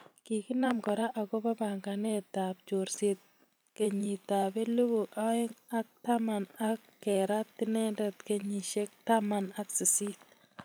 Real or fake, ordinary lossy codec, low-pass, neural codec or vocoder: fake; none; none; vocoder, 44.1 kHz, 128 mel bands every 512 samples, BigVGAN v2